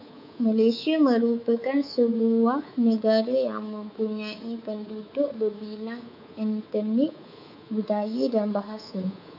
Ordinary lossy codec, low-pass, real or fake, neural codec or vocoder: AAC, 48 kbps; 5.4 kHz; fake; codec, 24 kHz, 3.1 kbps, DualCodec